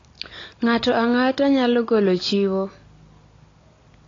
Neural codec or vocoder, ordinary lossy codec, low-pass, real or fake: none; AAC, 32 kbps; 7.2 kHz; real